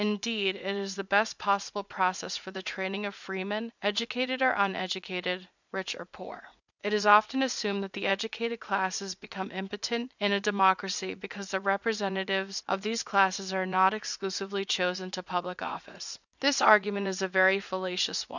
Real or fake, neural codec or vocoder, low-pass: fake; vocoder, 44.1 kHz, 80 mel bands, Vocos; 7.2 kHz